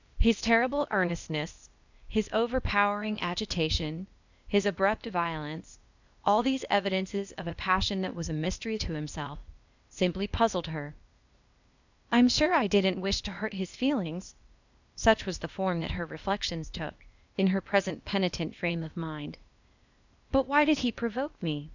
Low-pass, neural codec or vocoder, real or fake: 7.2 kHz; codec, 16 kHz, 0.8 kbps, ZipCodec; fake